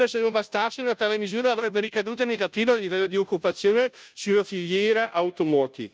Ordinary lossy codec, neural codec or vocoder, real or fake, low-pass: none; codec, 16 kHz, 0.5 kbps, FunCodec, trained on Chinese and English, 25 frames a second; fake; none